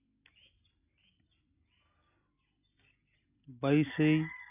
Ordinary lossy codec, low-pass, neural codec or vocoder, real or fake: none; 3.6 kHz; none; real